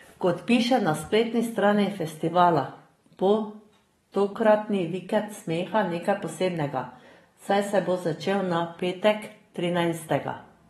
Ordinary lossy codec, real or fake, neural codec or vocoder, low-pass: AAC, 32 kbps; fake; vocoder, 44.1 kHz, 128 mel bands every 512 samples, BigVGAN v2; 19.8 kHz